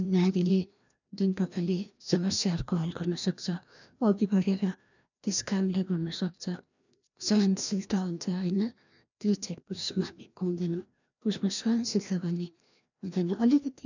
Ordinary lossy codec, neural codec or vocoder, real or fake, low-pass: none; codec, 16 kHz, 1 kbps, FreqCodec, larger model; fake; 7.2 kHz